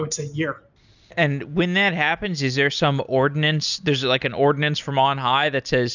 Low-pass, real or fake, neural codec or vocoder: 7.2 kHz; real; none